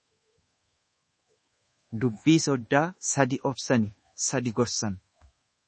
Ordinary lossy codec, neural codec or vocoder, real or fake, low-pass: MP3, 32 kbps; codec, 24 kHz, 1.2 kbps, DualCodec; fake; 10.8 kHz